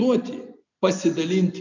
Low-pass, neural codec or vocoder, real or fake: 7.2 kHz; none; real